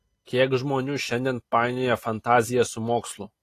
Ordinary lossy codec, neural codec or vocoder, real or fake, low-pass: AAC, 48 kbps; none; real; 14.4 kHz